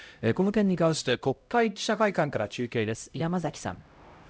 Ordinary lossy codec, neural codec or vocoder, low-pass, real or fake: none; codec, 16 kHz, 0.5 kbps, X-Codec, HuBERT features, trained on LibriSpeech; none; fake